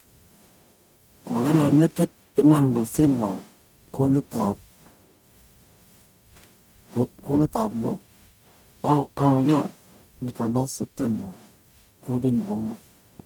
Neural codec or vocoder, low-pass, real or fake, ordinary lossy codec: codec, 44.1 kHz, 0.9 kbps, DAC; 19.8 kHz; fake; MP3, 96 kbps